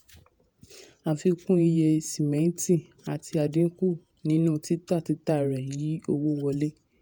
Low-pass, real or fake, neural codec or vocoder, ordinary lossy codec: none; fake; vocoder, 48 kHz, 128 mel bands, Vocos; none